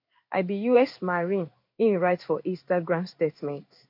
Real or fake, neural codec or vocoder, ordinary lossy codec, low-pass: fake; codec, 16 kHz in and 24 kHz out, 1 kbps, XY-Tokenizer; MP3, 32 kbps; 5.4 kHz